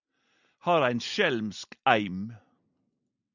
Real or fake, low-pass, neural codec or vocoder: real; 7.2 kHz; none